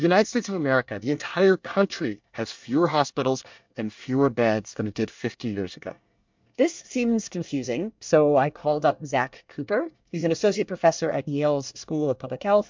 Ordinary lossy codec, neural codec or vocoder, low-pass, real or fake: MP3, 64 kbps; codec, 24 kHz, 1 kbps, SNAC; 7.2 kHz; fake